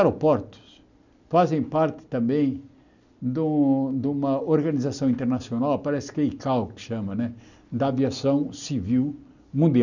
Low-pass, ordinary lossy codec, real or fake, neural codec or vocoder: 7.2 kHz; none; real; none